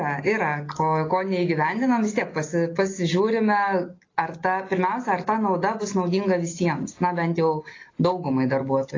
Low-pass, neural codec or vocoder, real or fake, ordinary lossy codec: 7.2 kHz; none; real; AAC, 32 kbps